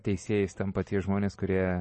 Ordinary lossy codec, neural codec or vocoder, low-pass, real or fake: MP3, 32 kbps; none; 10.8 kHz; real